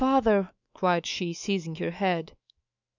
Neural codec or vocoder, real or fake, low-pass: autoencoder, 48 kHz, 128 numbers a frame, DAC-VAE, trained on Japanese speech; fake; 7.2 kHz